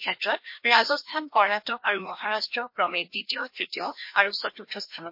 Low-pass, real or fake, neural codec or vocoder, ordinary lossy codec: 5.4 kHz; fake; codec, 16 kHz, 1 kbps, FreqCodec, larger model; MP3, 32 kbps